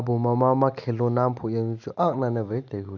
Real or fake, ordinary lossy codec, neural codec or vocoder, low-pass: real; none; none; 7.2 kHz